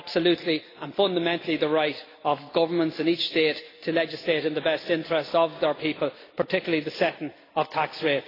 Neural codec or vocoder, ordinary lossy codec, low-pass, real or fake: none; AAC, 24 kbps; 5.4 kHz; real